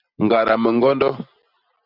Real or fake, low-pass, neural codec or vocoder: real; 5.4 kHz; none